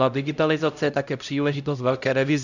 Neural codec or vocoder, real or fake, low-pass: codec, 16 kHz, 0.5 kbps, X-Codec, HuBERT features, trained on LibriSpeech; fake; 7.2 kHz